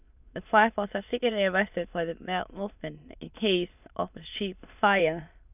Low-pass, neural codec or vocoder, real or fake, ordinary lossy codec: 3.6 kHz; autoencoder, 22.05 kHz, a latent of 192 numbers a frame, VITS, trained on many speakers; fake; none